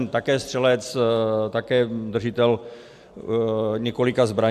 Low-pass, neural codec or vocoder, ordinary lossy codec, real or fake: 14.4 kHz; none; AAC, 96 kbps; real